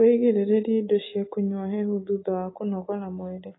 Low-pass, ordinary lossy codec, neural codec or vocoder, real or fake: 7.2 kHz; AAC, 16 kbps; none; real